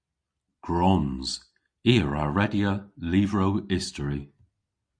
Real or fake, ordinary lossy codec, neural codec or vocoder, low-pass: real; Opus, 64 kbps; none; 9.9 kHz